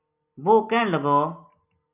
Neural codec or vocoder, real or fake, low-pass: none; real; 3.6 kHz